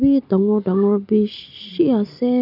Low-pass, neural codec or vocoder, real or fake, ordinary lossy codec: 5.4 kHz; none; real; none